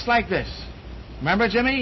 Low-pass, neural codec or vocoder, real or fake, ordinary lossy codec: 7.2 kHz; none; real; MP3, 24 kbps